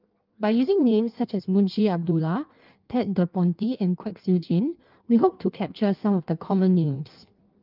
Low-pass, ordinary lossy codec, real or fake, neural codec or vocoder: 5.4 kHz; Opus, 32 kbps; fake; codec, 16 kHz in and 24 kHz out, 1.1 kbps, FireRedTTS-2 codec